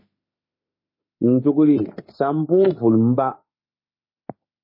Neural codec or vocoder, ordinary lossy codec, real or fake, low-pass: autoencoder, 48 kHz, 32 numbers a frame, DAC-VAE, trained on Japanese speech; MP3, 24 kbps; fake; 5.4 kHz